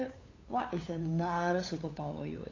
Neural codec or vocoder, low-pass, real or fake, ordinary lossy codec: codec, 16 kHz, 8 kbps, FunCodec, trained on LibriTTS, 25 frames a second; 7.2 kHz; fake; AAC, 32 kbps